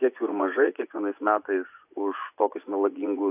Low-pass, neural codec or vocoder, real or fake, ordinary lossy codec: 3.6 kHz; vocoder, 24 kHz, 100 mel bands, Vocos; fake; AAC, 32 kbps